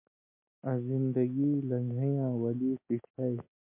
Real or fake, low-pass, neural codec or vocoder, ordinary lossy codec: real; 3.6 kHz; none; MP3, 24 kbps